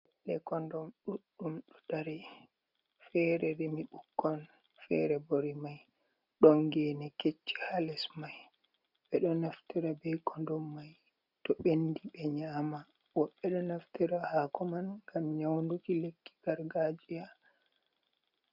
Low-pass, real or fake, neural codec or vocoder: 5.4 kHz; real; none